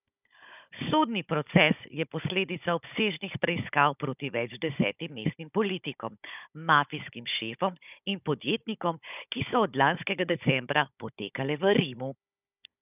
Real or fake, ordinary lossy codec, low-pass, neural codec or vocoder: fake; none; 3.6 kHz; codec, 16 kHz, 16 kbps, FunCodec, trained on Chinese and English, 50 frames a second